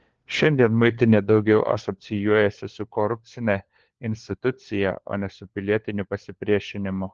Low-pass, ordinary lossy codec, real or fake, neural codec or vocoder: 7.2 kHz; Opus, 16 kbps; fake; codec, 16 kHz, 4 kbps, FunCodec, trained on LibriTTS, 50 frames a second